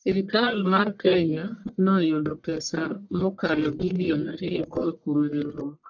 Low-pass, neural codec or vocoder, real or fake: 7.2 kHz; codec, 44.1 kHz, 1.7 kbps, Pupu-Codec; fake